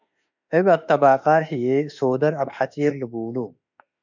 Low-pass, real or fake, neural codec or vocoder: 7.2 kHz; fake; autoencoder, 48 kHz, 32 numbers a frame, DAC-VAE, trained on Japanese speech